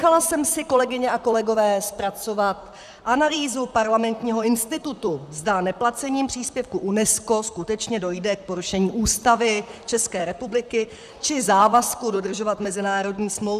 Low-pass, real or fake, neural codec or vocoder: 14.4 kHz; fake; vocoder, 44.1 kHz, 128 mel bands, Pupu-Vocoder